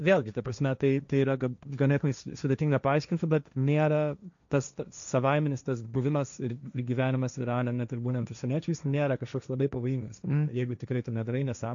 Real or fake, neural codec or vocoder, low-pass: fake; codec, 16 kHz, 1.1 kbps, Voila-Tokenizer; 7.2 kHz